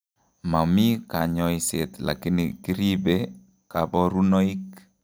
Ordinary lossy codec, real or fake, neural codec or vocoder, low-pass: none; real; none; none